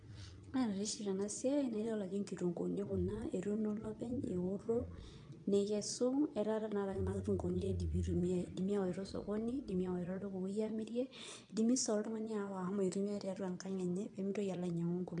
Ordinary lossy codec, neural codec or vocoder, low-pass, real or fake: MP3, 64 kbps; vocoder, 22.05 kHz, 80 mel bands, Vocos; 9.9 kHz; fake